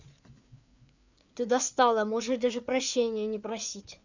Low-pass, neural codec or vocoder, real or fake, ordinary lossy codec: 7.2 kHz; codec, 16 kHz, 4 kbps, FreqCodec, larger model; fake; none